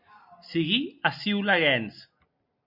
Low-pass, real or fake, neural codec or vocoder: 5.4 kHz; real; none